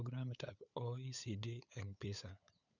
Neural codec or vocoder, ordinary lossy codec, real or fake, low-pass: codec, 16 kHz, 8 kbps, FunCodec, trained on LibriTTS, 25 frames a second; none; fake; 7.2 kHz